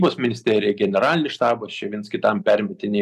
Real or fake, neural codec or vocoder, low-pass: real; none; 14.4 kHz